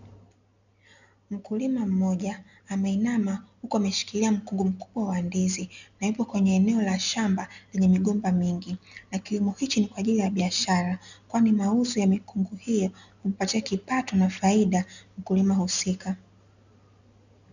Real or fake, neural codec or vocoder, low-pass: real; none; 7.2 kHz